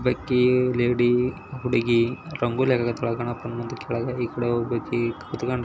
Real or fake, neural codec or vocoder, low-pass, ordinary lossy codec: real; none; none; none